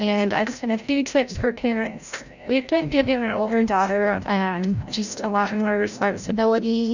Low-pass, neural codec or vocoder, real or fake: 7.2 kHz; codec, 16 kHz, 0.5 kbps, FreqCodec, larger model; fake